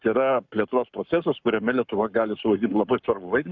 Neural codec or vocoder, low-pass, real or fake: codec, 44.1 kHz, 7.8 kbps, Pupu-Codec; 7.2 kHz; fake